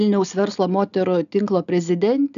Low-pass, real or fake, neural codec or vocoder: 7.2 kHz; real; none